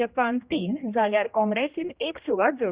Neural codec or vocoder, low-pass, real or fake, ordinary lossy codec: codec, 16 kHz, 1 kbps, X-Codec, HuBERT features, trained on general audio; 3.6 kHz; fake; Opus, 64 kbps